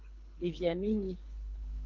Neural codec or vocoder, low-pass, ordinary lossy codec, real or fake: codec, 24 kHz, 3 kbps, HILCodec; 7.2 kHz; Opus, 24 kbps; fake